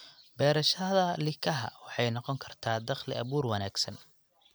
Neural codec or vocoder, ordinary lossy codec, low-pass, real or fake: none; none; none; real